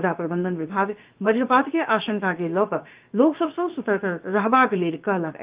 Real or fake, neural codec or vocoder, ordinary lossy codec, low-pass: fake; codec, 16 kHz, about 1 kbps, DyCAST, with the encoder's durations; Opus, 32 kbps; 3.6 kHz